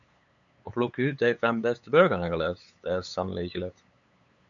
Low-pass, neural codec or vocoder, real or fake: 7.2 kHz; codec, 16 kHz, 8 kbps, FunCodec, trained on LibriTTS, 25 frames a second; fake